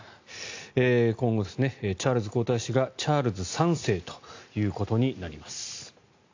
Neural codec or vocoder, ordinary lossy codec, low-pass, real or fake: none; AAC, 48 kbps; 7.2 kHz; real